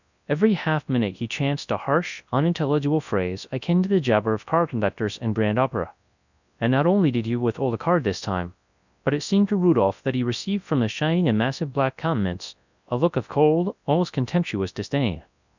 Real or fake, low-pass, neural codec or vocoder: fake; 7.2 kHz; codec, 24 kHz, 0.9 kbps, WavTokenizer, large speech release